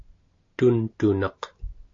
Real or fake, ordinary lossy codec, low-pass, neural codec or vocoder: real; AAC, 48 kbps; 7.2 kHz; none